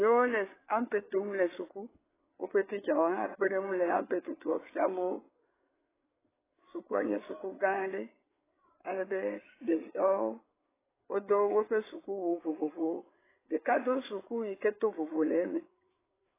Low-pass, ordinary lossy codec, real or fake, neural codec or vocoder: 3.6 kHz; AAC, 16 kbps; fake; codec, 16 kHz, 8 kbps, FreqCodec, larger model